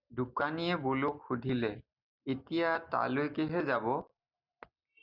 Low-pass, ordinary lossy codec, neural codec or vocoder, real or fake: 5.4 kHz; Opus, 64 kbps; none; real